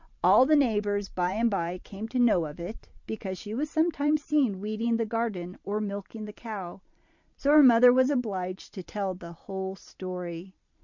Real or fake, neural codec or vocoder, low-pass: fake; vocoder, 44.1 kHz, 128 mel bands every 512 samples, BigVGAN v2; 7.2 kHz